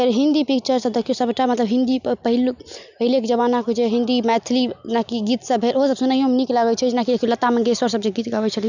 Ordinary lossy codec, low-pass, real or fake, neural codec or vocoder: none; 7.2 kHz; real; none